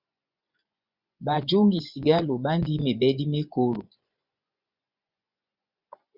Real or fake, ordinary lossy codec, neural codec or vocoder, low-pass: fake; Opus, 64 kbps; vocoder, 24 kHz, 100 mel bands, Vocos; 5.4 kHz